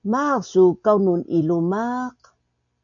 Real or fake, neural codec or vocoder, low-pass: real; none; 7.2 kHz